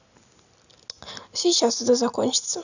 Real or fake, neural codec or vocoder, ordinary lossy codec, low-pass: real; none; none; 7.2 kHz